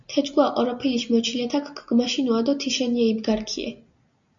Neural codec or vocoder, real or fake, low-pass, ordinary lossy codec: none; real; 7.2 kHz; MP3, 64 kbps